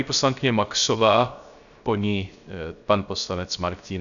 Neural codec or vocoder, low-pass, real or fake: codec, 16 kHz, 0.3 kbps, FocalCodec; 7.2 kHz; fake